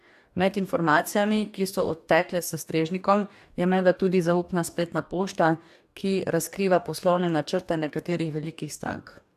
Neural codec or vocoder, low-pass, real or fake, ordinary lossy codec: codec, 44.1 kHz, 2.6 kbps, DAC; 14.4 kHz; fake; AAC, 96 kbps